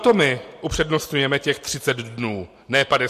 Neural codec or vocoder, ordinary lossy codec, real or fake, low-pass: none; MP3, 64 kbps; real; 14.4 kHz